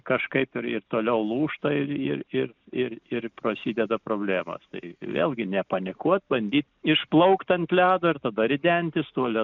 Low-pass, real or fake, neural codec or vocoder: 7.2 kHz; real; none